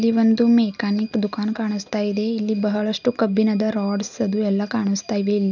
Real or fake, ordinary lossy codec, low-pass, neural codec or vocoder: real; none; 7.2 kHz; none